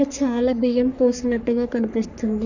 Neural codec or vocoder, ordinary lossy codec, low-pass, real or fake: codec, 44.1 kHz, 3.4 kbps, Pupu-Codec; none; 7.2 kHz; fake